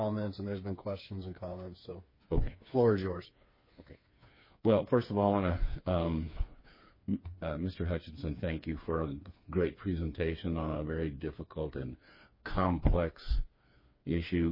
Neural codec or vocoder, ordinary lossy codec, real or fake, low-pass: codec, 16 kHz, 4 kbps, FreqCodec, smaller model; MP3, 24 kbps; fake; 5.4 kHz